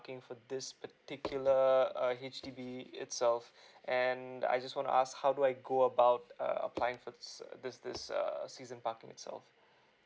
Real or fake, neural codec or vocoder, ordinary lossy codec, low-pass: real; none; none; none